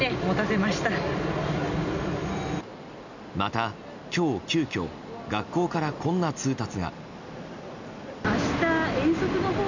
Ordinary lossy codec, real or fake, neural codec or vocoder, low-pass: none; real; none; 7.2 kHz